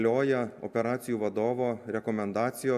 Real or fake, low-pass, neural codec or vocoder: real; 14.4 kHz; none